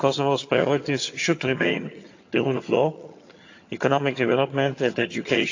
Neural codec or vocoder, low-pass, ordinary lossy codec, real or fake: vocoder, 22.05 kHz, 80 mel bands, HiFi-GAN; 7.2 kHz; AAC, 48 kbps; fake